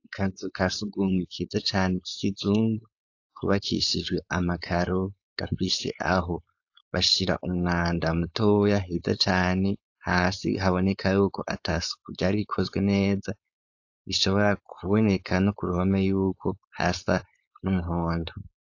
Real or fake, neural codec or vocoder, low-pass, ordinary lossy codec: fake; codec, 16 kHz, 4.8 kbps, FACodec; 7.2 kHz; AAC, 48 kbps